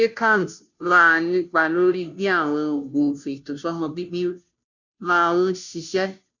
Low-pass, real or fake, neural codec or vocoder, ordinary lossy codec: 7.2 kHz; fake; codec, 16 kHz, 0.5 kbps, FunCodec, trained on Chinese and English, 25 frames a second; none